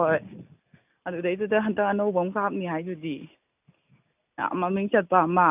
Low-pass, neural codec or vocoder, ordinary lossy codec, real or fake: 3.6 kHz; none; none; real